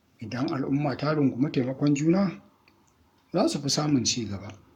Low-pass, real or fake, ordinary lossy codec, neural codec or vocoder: 19.8 kHz; fake; none; codec, 44.1 kHz, 7.8 kbps, Pupu-Codec